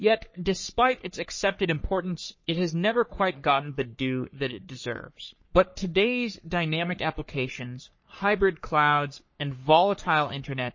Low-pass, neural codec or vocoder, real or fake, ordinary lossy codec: 7.2 kHz; codec, 44.1 kHz, 3.4 kbps, Pupu-Codec; fake; MP3, 32 kbps